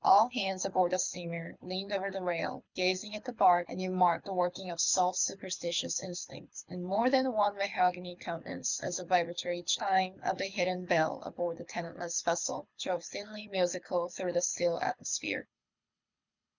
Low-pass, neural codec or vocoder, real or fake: 7.2 kHz; codec, 24 kHz, 6 kbps, HILCodec; fake